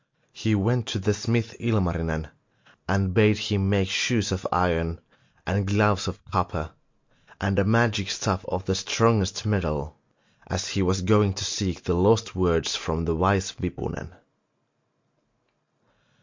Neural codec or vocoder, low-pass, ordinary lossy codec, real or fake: none; 7.2 kHz; MP3, 48 kbps; real